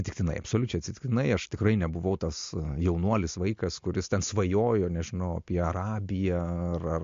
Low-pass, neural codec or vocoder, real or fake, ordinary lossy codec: 7.2 kHz; none; real; MP3, 48 kbps